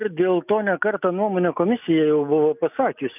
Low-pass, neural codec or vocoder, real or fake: 3.6 kHz; none; real